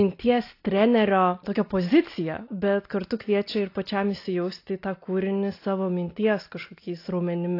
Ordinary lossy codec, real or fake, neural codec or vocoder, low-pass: AAC, 32 kbps; real; none; 5.4 kHz